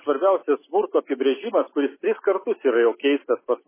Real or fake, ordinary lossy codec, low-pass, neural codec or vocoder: real; MP3, 16 kbps; 3.6 kHz; none